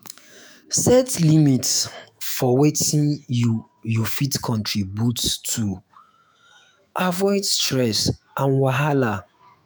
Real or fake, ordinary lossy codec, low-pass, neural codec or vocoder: fake; none; none; autoencoder, 48 kHz, 128 numbers a frame, DAC-VAE, trained on Japanese speech